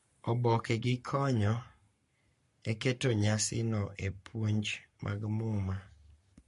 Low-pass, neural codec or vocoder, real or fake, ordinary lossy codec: 14.4 kHz; codec, 44.1 kHz, 7.8 kbps, Pupu-Codec; fake; MP3, 48 kbps